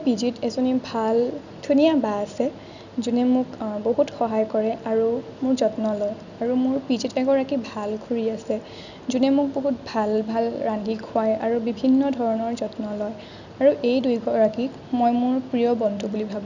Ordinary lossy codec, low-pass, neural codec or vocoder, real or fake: none; 7.2 kHz; none; real